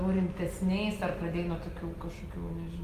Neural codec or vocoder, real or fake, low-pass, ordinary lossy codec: none; real; 14.4 kHz; Opus, 32 kbps